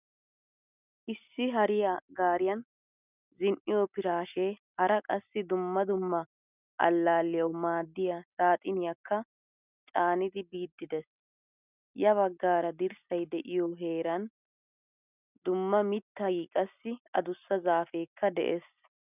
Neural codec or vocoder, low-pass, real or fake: none; 3.6 kHz; real